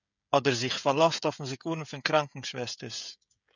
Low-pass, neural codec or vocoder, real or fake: 7.2 kHz; codec, 16 kHz, 16 kbps, FreqCodec, smaller model; fake